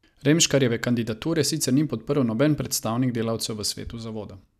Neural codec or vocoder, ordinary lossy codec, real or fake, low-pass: none; none; real; 14.4 kHz